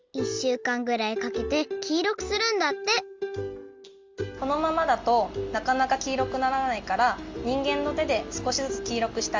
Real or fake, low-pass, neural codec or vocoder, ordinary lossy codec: real; 7.2 kHz; none; Opus, 32 kbps